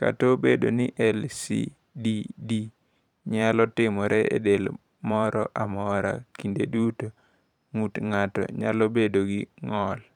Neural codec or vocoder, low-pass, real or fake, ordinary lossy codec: vocoder, 44.1 kHz, 128 mel bands every 512 samples, BigVGAN v2; 19.8 kHz; fake; none